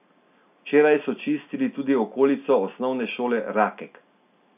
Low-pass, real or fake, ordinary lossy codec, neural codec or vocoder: 3.6 kHz; real; none; none